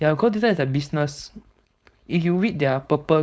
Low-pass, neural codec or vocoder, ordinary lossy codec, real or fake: none; codec, 16 kHz, 4.8 kbps, FACodec; none; fake